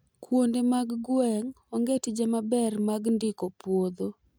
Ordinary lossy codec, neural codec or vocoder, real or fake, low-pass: none; none; real; none